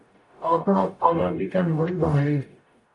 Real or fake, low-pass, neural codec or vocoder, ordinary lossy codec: fake; 10.8 kHz; codec, 44.1 kHz, 0.9 kbps, DAC; AAC, 48 kbps